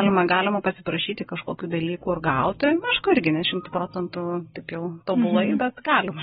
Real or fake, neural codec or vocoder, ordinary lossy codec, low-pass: real; none; AAC, 16 kbps; 10.8 kHz